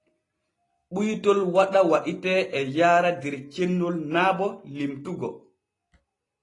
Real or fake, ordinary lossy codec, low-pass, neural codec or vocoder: real; AAC, 32 kbps; 10.8 kHz; none